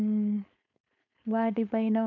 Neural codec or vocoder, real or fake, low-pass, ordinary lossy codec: codec, 16 kHz, 4.8 kbps, FACodec; fake; 7.2 kHz; none